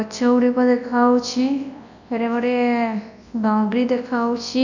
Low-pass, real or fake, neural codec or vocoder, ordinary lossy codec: 7.2 kHz; fake; codec, 24 kHz, 0.9 kbps, WavTokenizer, large speech release; none